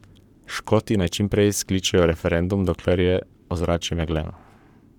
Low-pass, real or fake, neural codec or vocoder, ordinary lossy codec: 19.8 kHz; fake; codec, 44.1 kHz, 7.8 kbps, Pupu-Codec; none